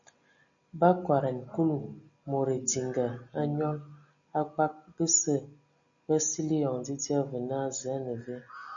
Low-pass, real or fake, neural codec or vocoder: 7.2 kHz; real; none